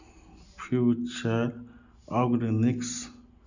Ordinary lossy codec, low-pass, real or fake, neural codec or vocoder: none; 7.2 kHz; real; none